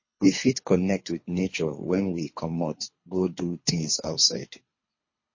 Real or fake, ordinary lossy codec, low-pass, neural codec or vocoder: fake; MP3, 32 kbps; 7.2 kHz; codec, 24 kHz, 3 kbps, HILCodec